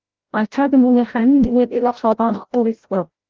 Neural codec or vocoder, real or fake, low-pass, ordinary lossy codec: codec, 16 kHz, 0.5 kbps, FreqCodec, larger model; fake; 7.2 kHz; Opus, 16 kbps